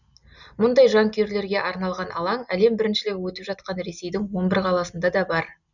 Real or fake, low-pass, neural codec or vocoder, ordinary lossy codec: real; 7.2 kHz; none; none